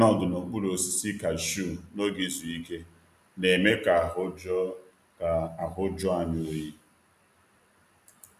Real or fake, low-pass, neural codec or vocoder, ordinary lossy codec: real; 14.4 kHz; none; none